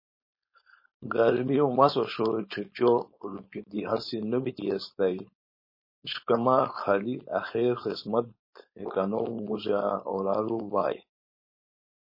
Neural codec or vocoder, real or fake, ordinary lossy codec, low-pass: codec, 16 kHz, 4.8 kbps, FACodec; fake; MP3, 24 kbps; 5.4 kHz